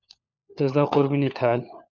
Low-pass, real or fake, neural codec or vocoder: 7.2 kHz; fake; codec, 16 kHz, 4 kbps, FunCodec, trained on LibriTTS, 50 frames a second